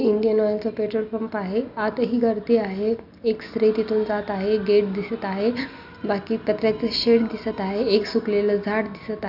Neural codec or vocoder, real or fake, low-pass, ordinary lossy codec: none; real; 5.4 kHz; none